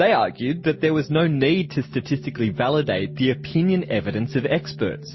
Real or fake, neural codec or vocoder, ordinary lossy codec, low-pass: real; none; MP3, 24 kbps; 7.2 kHz